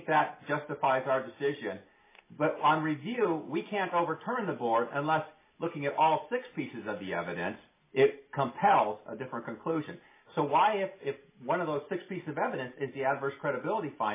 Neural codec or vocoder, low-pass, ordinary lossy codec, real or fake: none; 3.6 kHz; MP3, 16 kbps; real